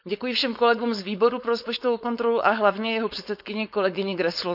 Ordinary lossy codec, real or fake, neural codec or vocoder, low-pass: none; fake; codec, 16 kHz, 4.8 kbps, FACodec; 5.4 kHz